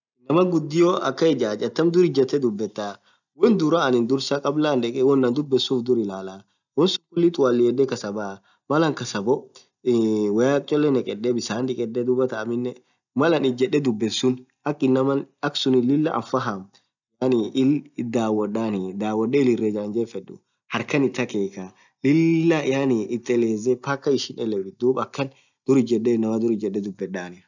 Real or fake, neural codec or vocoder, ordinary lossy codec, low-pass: real; none; none; 7.2 kHz